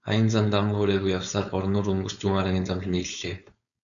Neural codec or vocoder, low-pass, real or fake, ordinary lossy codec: codec, 16 kHz, 4.8 kbps, FACodec; 7.2 kHz; fake; MP3, 96 kbps